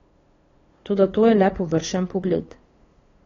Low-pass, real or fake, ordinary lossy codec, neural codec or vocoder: 7.2 kHz; fake; AAC, 32 kbps; codec, 16 kHz, 2 kbps, FunCodec, trained on LibriTTS, 25 frames a second